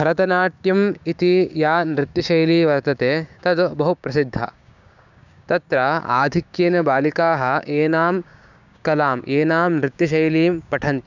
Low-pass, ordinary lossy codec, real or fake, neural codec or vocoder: 7.2 kHz; none; fake; codec, 16 kHz, 6 kbps, DAC